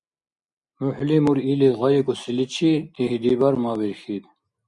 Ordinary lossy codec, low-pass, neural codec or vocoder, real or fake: Opus, 64 kbps; 10.8 kHz; none; real